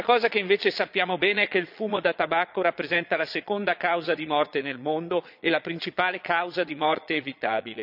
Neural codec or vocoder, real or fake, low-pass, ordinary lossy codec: vocoder, 22.05 kHz, 80 mel bands, Vocos; fake; 5.4 kHz; none